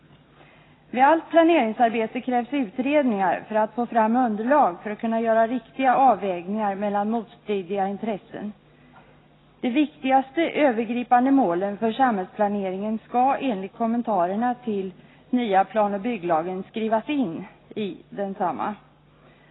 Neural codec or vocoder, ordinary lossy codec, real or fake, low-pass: none; AAC, 16 kbps; real; 7.2 kHz